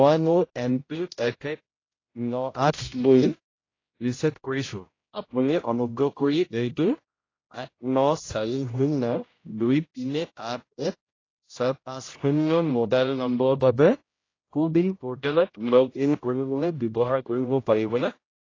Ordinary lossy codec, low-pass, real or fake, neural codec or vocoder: AAC, 32 kbps; 7.2 kHz; fake; codec, 16 kHz, 0.5 kbps, X-Codec, HuBERT features, trained on general audio